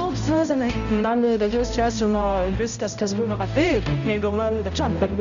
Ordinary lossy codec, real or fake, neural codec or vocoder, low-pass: Opus, 64 kbps; fake; codec, 16 kHz, 0.5 kbps, X-Codec, HuBERT features, trained on balanced general audio; 7.2 kHz